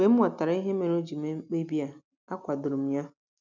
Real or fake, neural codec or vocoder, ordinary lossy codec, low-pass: real; none; none; 7.2 kHz